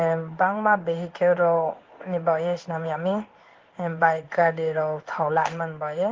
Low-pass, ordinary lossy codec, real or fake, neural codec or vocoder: 7.2 kHz; Opus, 16 kbps; fake; codec, 16 kHz in and 24 kHz out, 1 kbps, XY-Tokenizer